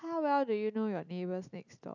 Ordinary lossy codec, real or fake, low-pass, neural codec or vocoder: none; real; 7.2 kHz; none